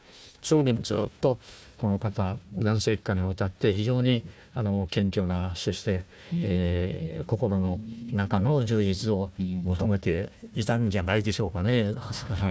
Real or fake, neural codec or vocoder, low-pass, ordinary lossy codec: fake; codec, 16 kHz, 1 kbps, FunCodec, trained on Chinese and English, 50 frames a second; none; none